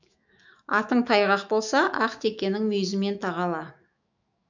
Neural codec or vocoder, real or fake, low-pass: autoencoder, 48 kHz, 128 numbers a frame, DAC-VAE, trained on Japanese speech; fake; 7.2 kHz